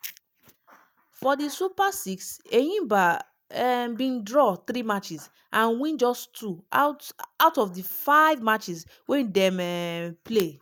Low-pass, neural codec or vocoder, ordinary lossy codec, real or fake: none; none; none; real